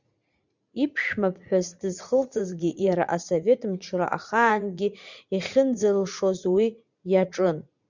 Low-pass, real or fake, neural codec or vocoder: 7.2 kHz; fake; vocoder, 44.1 kHz, 80 mel bands, Vocos